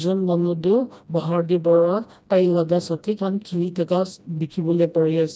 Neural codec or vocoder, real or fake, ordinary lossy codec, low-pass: codec, 16 kHz, 1 kbps, FreqCodec, smaller model; fake; none; none